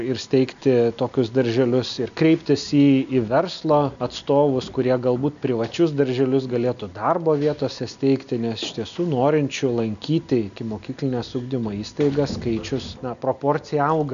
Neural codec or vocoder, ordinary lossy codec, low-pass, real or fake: none; MP3, 96 kbps; 7.2 kHz; real